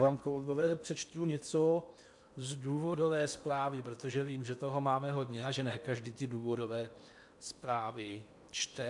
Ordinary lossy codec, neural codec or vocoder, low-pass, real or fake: MP3, 96 kbps; codec, 16 kHz in and 24 kHz out, 0.8 kbps, FocalCodec, streaming, 65536 codes; 10.8 kHz; fake